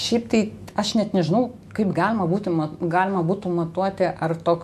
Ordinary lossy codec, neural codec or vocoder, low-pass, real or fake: MP3, 64 kbps; autoencoder, 48 kHz, 128 numbers a frame, DAC-VAE, trained on Japanese speech; 14.4 kHz; fake